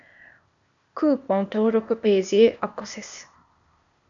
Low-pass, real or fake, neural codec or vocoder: 7.2 kHz; fake; codec, 16 kHz, 0.8 kbps, ZipCodec